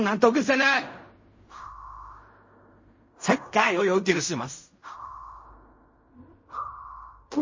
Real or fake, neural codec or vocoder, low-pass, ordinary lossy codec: fake; codec, 16 kHz in and 24 kHz out, 0.4 kbps, LongCat-Audio-Codec, fine tuned four codebook decoder; 7.2 kHz; MP3, 32 kbps